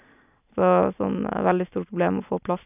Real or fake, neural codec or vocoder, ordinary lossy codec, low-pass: real; none; none; 3.6 kHz